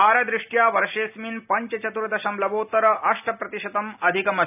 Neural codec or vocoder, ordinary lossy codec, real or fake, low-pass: none; none; real; 3.6 kHz